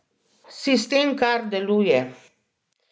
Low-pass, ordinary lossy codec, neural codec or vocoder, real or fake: none; none; none; real